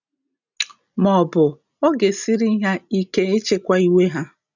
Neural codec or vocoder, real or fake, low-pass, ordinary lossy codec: none; real; 7.2 kHz; none